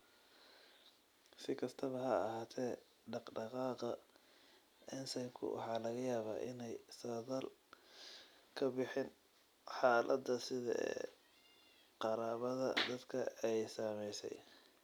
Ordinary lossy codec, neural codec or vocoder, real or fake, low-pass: none; none; real; none